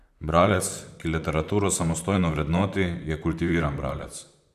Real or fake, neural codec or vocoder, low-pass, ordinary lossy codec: fake; vocoder, 44.1 kHz, 128 mel bands, Pupu-Vocoder; 14.4 kHz; none